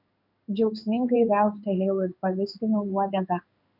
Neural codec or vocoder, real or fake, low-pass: codec, 16 kHz in and 24 kHz out, 1 kbps, XY-Tokenizer; fake; 5.4 kHz